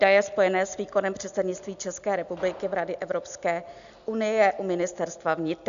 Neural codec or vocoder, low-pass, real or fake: none; 7.2 kHz; real